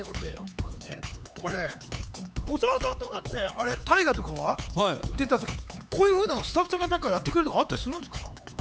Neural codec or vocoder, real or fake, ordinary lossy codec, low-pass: codec, 16 kHz, 4 kbps, X-Codec, HuBERT features, trained on LibriSpeech; fake; none; none